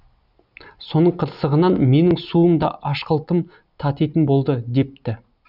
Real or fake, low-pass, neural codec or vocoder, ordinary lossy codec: real; 5.4 kHz; none; none